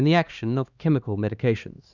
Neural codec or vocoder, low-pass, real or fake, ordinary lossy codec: codec, 16 kHz, 2 kbps, X-Codec, HuBERT features, trained on LibriSpeech; 7.2 kHz; fake; Opus, 64 kbps